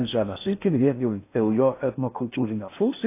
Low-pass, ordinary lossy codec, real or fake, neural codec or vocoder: 3.6 kHz; AAC, 24 kbps; fake; codec, 16 kHz in and 24 kHz out, 0.6 kbps, FocalCodec, streaming, 4096 codes